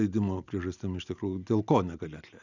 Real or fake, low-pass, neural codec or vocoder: real; 7.2 kHz; none